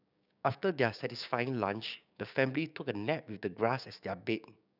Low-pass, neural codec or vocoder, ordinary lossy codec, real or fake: 5.4 kHz; codec, 16 kHz, 6 kbps, DAC; none; fake